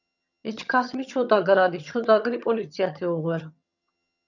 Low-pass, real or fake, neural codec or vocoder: 7.2 kHz; fake; vocoder, 22.05 kHz, 80 mel bands, HiFi-GAN